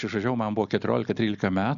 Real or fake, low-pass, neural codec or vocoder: real; 7.2 kHz; none